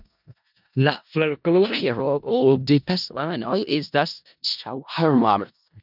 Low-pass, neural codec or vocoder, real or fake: 5.4 kHz; codec, 16 kHz in and 24 kHz out, 0.4 kbps, LongCat-Audio-Codec, four codebook decoder; fake